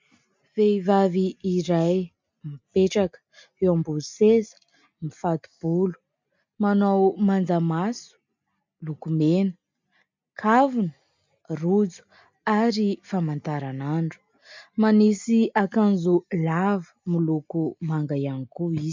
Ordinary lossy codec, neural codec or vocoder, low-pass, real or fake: MP3, 64 kbps; none; 7.2 kHz; real